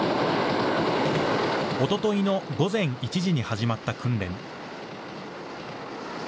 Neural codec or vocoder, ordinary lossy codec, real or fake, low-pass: none; none; real; none